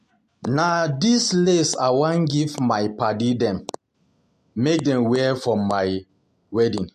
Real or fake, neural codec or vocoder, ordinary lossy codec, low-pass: real; none; MP3, 64 kbps; 14.4 kHz